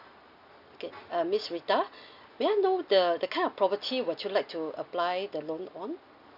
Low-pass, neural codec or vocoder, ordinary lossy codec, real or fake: 5.4 kHz; none; none; real